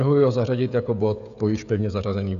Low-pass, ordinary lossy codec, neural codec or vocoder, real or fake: 7.2 kHz; MP3, 96 kbps; codec, 16 kHz, 16 kbps, FreqCodec, smaller model; fake